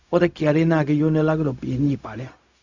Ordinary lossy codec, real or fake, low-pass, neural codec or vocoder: Opus, 64 kbps; fake; 7.2 kHz; codec, 16 kHz, 0.4 kbps, LongCat-Audio-Codec